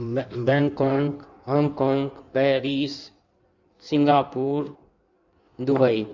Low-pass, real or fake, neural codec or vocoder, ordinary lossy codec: 7.2 kHz; fake; codec, 16 kHz in and 24 kHz out, 1.1 kbps, FireRedTTS-2 codec; none